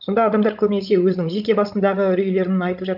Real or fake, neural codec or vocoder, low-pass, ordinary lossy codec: fake; codec, 16 kHz, 16 kbps, FreqCodec, larger model; 5.4 kHz; AAC, 48 kbps